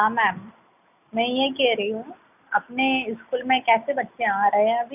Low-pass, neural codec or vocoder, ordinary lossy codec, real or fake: 3.6 kHz; none; none; real